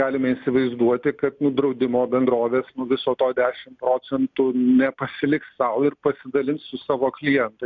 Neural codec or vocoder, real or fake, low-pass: none; real; 7.2 kHz